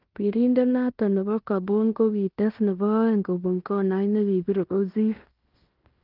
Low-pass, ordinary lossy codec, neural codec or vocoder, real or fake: 5.4 kHz; Opus, 32 kbps; codec, 16 kHz in and 24 kHz out, 0.9 kbps, LongCat-Audio-Codec, fine tuned four codebook decoder; fake